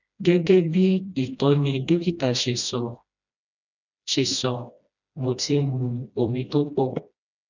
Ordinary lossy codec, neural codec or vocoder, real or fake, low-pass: none; codec, 16 kHz, 1 kbps, FreqCodec, smaller model; fake; 7.2 kHz